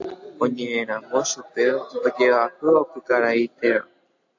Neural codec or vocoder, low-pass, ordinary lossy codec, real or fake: none; 7.2 kHz; AAC, 48 kbps; real